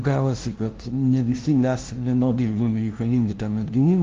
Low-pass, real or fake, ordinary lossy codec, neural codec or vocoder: 7.2 kHz; fake; Opus, 16 kbps; codec, 16 kHz, 0.5 kbps, FunCodec, trained on LibriTTS, 25 frames a second